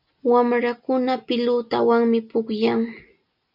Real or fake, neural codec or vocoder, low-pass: real; none; 5.4 kHz